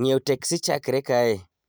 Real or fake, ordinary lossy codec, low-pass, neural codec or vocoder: real; none; none; none